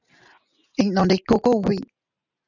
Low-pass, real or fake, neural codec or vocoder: 7.2 kHz; real; none